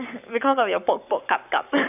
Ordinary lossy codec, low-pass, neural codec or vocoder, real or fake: none; 3.6 kHz; codec, 44.1 kHz, 7.8 kbps, Pupu-Codec; fake